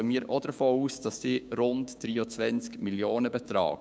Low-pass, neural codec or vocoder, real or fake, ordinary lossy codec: none; codec, 16 kHz, 6 kbps, DAC; fake; none